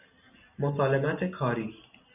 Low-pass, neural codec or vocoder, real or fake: 3.6 kHz; none; real